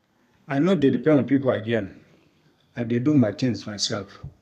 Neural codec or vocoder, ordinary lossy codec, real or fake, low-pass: codec, 32 kHz, 1.9 kbps, SNAC; none; fake; 14.4 kHz